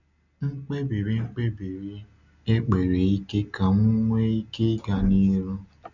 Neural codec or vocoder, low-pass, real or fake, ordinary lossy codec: none; 7.2 kHz; real; none